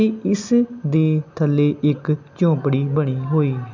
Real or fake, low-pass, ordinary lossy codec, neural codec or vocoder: real; 7.2 kHz; none; none